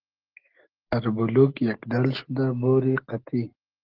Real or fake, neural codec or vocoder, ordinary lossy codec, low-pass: real; none; Opus, 16 kbps; 5.4 kHz